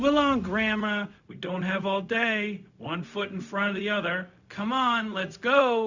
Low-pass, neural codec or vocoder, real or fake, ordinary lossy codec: 7.2 kHz; codec, 16 kHz, 0.4 kbps, LongCat-Audio-Codec; fake; Opus, 64 kbps